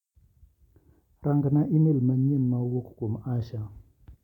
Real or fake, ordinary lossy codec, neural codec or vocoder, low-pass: real; none; none; 19.8 kHz